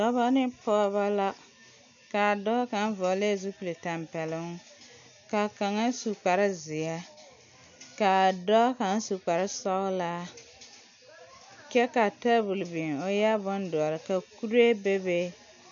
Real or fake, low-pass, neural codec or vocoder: real; 7.2 kHz; none